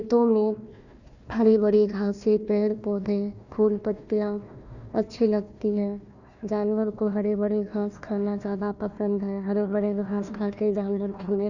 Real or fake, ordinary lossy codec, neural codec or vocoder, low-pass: fake; none; codec, 16 kHz, 1 kbps, FunCodec, trained on Chinese and English, 50 frames a second; 7.2 kHz